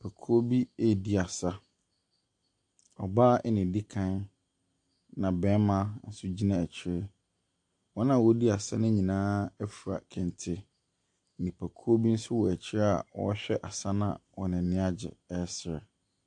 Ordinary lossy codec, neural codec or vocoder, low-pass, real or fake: AAC, 64 kbps; none; 10.8 kHz; real